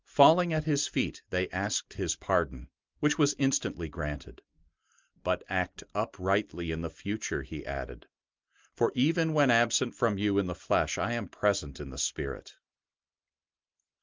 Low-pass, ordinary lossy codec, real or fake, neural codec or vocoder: 7.2 kHz; Opus, 24 kbps; real; none